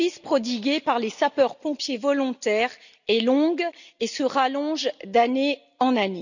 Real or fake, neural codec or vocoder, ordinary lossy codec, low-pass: real; none; none; 7.2 kHz